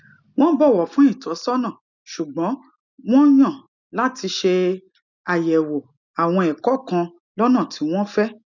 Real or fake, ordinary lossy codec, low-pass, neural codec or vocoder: real; none; 7.2 kHz; none